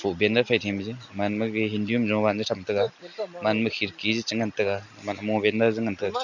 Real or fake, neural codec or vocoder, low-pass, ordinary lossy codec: real; none; 7.2 kHz; none